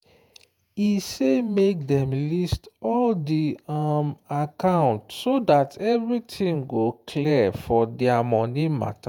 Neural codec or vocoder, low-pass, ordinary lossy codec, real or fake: vocoder, 48 kHz, 128 mel bands, Vocos; none; none; fake